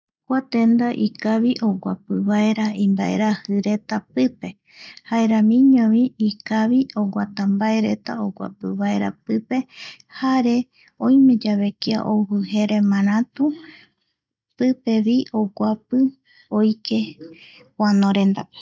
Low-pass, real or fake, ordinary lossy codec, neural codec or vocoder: none; real; none; none